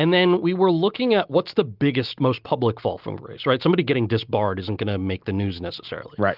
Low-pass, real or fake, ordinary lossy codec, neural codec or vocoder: 5.4 kHz; real; Opus, 32 kbps; none